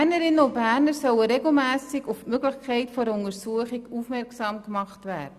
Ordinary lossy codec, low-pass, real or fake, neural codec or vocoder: Opus, 64 kbps; 14.4 kHz; real; none